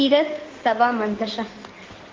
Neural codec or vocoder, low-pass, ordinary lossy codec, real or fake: codec, 16 kHz in and 24 kHz out, 2.2 kbps, FireRedTTS-2 codec; 7.2 kHz; Opus, 16 kbps; fake